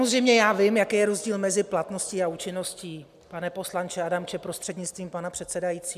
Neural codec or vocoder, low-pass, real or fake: none; 14.4 kHz; real